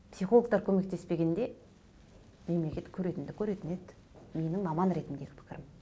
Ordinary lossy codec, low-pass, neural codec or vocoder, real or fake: none; none; none; real